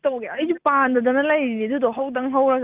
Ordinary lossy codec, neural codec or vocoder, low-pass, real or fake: Opus, 32 kbps; none; 3.6 kHz; real